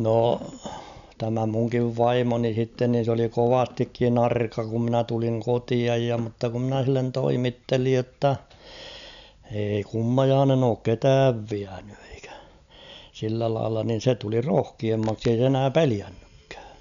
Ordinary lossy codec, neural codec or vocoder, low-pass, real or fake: none; none; 7.2 kHz; real